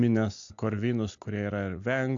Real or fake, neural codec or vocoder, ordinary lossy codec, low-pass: real; none; AAC, 64 kbps; 7.2 kHz